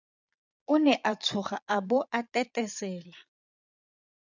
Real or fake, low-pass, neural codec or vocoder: fake; 7.2 kHz; vocoder, 22.05 kHz, 80 mel bands, Vocos